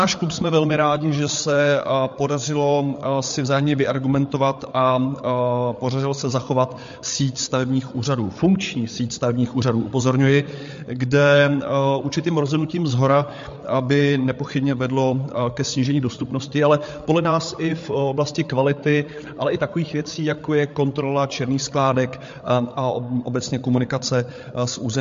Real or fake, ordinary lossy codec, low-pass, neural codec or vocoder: fake; MP3, 48 kbps; 7.2 kHz; codec, 16 kHz, 8 kbps, FreqCodec, larger model